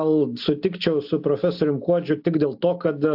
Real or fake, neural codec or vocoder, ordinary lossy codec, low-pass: real; none; AAC, 48 kbps; 5.4 kHz